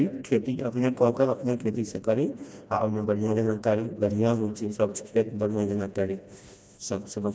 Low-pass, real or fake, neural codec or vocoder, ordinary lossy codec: none; fake; codec, 16 kHz, 1 kbps, FreqCodec, smaller model; none